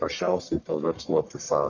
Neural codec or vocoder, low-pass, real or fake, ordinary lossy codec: codec, 44.1 kHz, 1.7 kbps, Pupu-Codec; 7.2 kHz; fake; Opus, 64 kbps